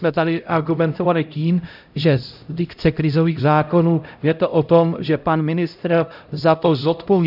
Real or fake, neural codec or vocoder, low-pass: fake; codec, 16 kHz, 0.5 kbps, X-Codec, HuBERT features, trained on LibriSpeech; 5.4 kHz